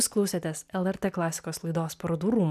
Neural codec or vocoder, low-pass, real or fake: none; 14.4 kHz; real